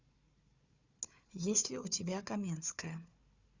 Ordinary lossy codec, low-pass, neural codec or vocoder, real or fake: Opus, 64 kbps; 7.2 kHz; codec, 16 kHz, 4 kbps, FunCodec, trained on Chinese and English, 50 frames a second; fake